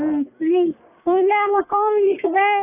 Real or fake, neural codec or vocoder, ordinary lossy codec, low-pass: fake; codec, 44.1 kHz, 1.7 kbps, Pupu-Codec; none; 3.6 kHz